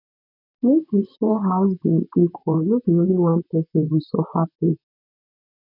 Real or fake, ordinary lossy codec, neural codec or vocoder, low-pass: fake; none; vocoder, 44.1 kHz, 128 mel bands, Pupu-Vocoder; 5.4 kHz